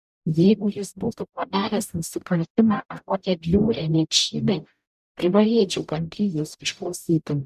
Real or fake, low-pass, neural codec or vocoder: fake; 14.4 kHz; codec, 44.1 kHz, 0.9 kbps, DAC